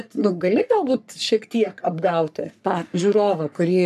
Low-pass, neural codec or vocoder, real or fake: 14.4 kHz; codec, 44.1 kHz, 3.4 kbps, Pupu-Codec; fake